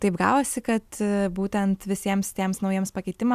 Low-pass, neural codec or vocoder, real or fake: 14.4 kHz; none; real